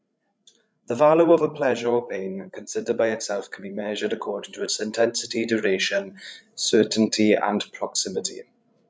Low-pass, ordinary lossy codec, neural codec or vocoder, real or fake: none; none; codec, 16 kHz, 16 kbps, FreqCodec, larger model; fake